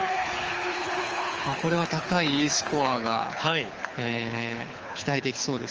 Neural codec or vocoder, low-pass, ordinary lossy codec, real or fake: codec, 24 kHz, 6 kbps, HILCodec; 7.2 kHz; Opus, 24 kbps; fake